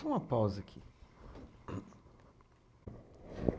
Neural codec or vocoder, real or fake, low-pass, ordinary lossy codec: none; real; none; none